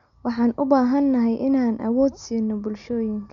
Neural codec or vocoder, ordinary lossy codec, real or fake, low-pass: none; none; real; 7.2 kHz